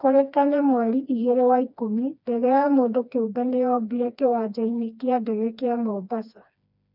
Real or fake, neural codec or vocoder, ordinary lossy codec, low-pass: fake; codec, 16 kHz, 2 kbps, FreqCodec, smaller model; none; 5.4 kHz